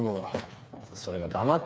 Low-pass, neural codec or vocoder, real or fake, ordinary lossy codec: none; codec, 16 kHz, 4 kbps, FreqCodec, smaller model; fake; none